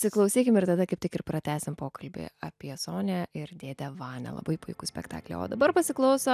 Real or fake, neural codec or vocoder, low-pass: real; none; 14.4 kHz